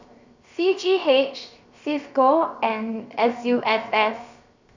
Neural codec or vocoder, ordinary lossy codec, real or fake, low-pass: codec, 16 kHz, 0.7 kbps, FocalCodec; Opus, 64 kbps; fake; 7.2 kHz